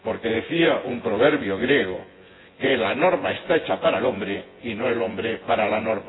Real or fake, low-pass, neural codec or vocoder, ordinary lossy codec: fake; 7.2 kHz; vocoder, 24 kHz, 100 mel bands, Vocos; AAC, 16 kbps